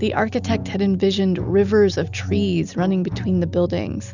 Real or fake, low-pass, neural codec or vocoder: real; 7.2 kHz; none